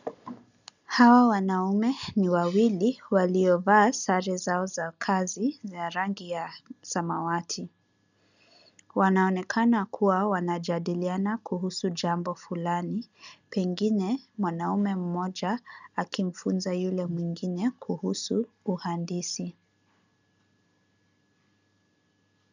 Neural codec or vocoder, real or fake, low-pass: none; real; 7.2 kHz